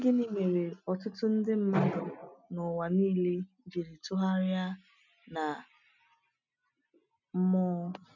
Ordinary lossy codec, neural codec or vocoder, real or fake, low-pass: none; none; real; 7.2 kHz